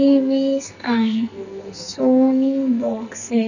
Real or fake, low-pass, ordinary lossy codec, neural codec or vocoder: fake; 7.2 kHz; none; codec, 44.1 kHz, 2.6 kbps, SNAC